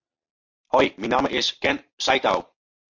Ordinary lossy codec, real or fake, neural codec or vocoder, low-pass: MP3, 48 kbps; real; none; 7.2 kHz